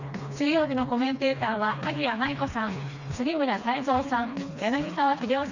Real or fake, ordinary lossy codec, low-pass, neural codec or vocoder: fake; none; 7.2 kHz; codec, 16 kHz, 2 kbps, FreqCodec, smaller model